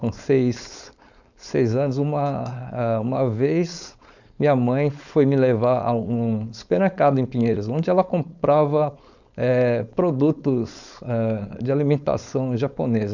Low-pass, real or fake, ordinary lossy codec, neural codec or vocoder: 7.2 kHz; fake; none; codec, 16 kHz, 4.8 kbps, FACodec